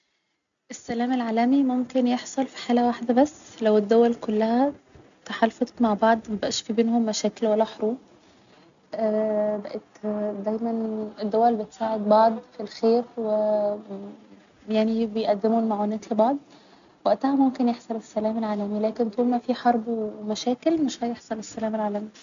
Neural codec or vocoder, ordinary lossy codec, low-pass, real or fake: none; none; 7.2 kHz; real